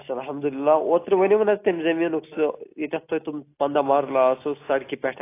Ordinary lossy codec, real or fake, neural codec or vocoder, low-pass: AAC, 24 kbps; real; none; 3.6 kHz